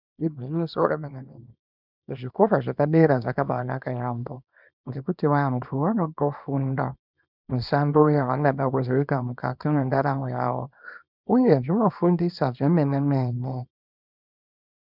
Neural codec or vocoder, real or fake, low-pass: codec, 24 kHz, 0.9 kbps, WavTokenizer, small release; fake; 5.4 kHz